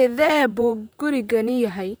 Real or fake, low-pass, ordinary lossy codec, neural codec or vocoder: fake; none; none; vocoder, 44.1 kHz, 128 mel bands, Pupu-Vocoder